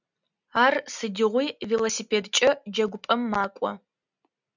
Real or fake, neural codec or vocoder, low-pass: real; none; 7.2 kHz